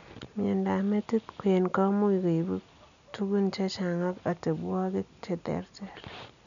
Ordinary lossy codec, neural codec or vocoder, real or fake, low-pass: none; none; real; 7.2 kHz